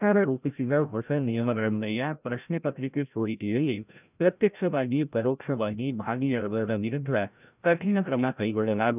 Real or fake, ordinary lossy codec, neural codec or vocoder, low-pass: fake; none; codec, 16 kHz, 0.5 kbps, FreqCodec, larger model; 3.6 kHz